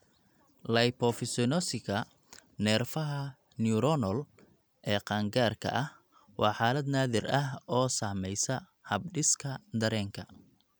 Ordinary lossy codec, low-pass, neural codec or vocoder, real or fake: none; none; none; real